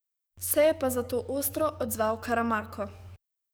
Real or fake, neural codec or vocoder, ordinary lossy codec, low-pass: fake; codec, 44.1 kHz, 7.8 kbps, DAC; none; none